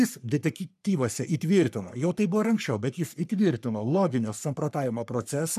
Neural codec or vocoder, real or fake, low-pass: codec, 44.1 kHz, 3.4 kbps, Pupu-Codec; fake; 14.4 kHz